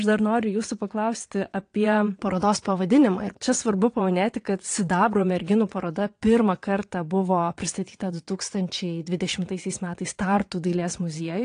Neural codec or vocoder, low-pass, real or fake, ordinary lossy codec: vocoder, 22.05 kHz, 80 mel bands, WaveNeXt; 9.9 kHz; fake; AAC, 48 kbps